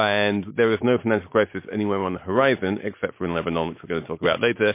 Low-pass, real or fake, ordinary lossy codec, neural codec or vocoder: 3.6 kHz; real; MP3, 24 kbps; none